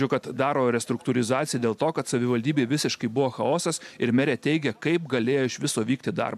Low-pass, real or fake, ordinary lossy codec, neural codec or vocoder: 14.4 kHz; real; MP3, 96 kbps; none